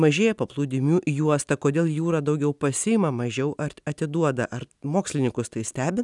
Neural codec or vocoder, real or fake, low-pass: none; real; 10.8 kHz